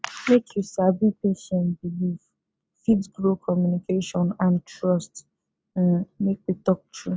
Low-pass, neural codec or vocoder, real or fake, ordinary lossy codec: none; none; real; none